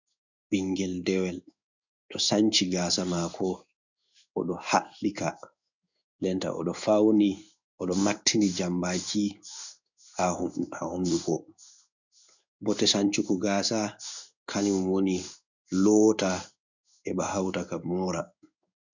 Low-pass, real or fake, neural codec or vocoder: 7.2 kHz; fake; codec, 16 kHz in and 24 kHz out, 1 kbps, XY-Tokenizer